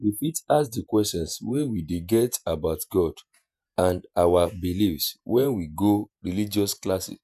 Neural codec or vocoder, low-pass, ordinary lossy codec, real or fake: vocoder, 48 kHz, 128 mel bands, Vocos; 14.4 kHz; none; fake